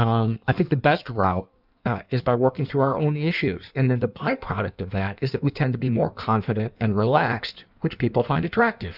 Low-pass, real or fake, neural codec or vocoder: 5.4 kHz; fake; codec, 16 kHz in and 24 kHz out, 1.1 kbps, FireRedTTS-2 codec